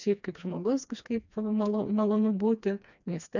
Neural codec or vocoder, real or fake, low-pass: codec, 16 kHz, 2 kbps, FreqCodec, smaller model; fake; 7.2 kHz